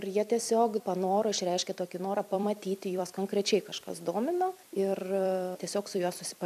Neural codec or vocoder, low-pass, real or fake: vocoder, 44.1 kHz, 128 mel bands every 256 samples, BigVGAN v2; 14.4 kHz; fake